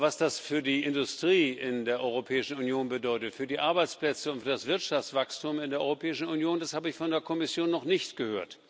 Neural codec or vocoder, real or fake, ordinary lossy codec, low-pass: none; real; none; none